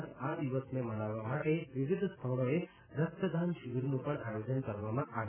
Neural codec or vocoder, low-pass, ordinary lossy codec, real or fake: none; 3.6 kHz; none; real